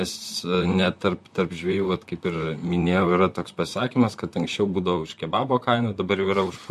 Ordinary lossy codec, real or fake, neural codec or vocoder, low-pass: MP3, 64 kbps; fake; vocoder, 44.1 kHz, 128 mel bands, Pupu-Vocoder; 14.4 kHz